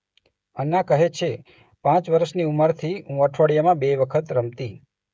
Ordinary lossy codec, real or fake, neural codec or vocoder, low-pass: none; fake; codec, 16 kHz, 16 kbps, FreqCodec, smaller model; none